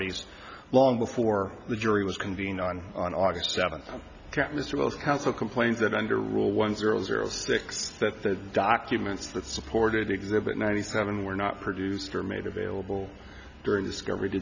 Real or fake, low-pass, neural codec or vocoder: real; 7.2 kHz; none